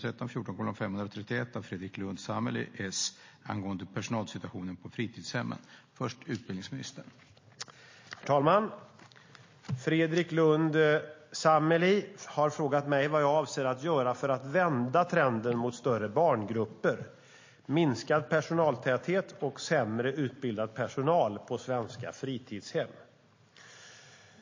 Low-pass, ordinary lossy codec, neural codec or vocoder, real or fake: 7.2 kHz; MP3, 32 kbps; none; real